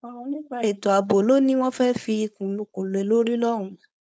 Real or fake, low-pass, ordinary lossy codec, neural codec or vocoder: fake; none; none; codec, 16 kHz, 4.8 kbps, FACodec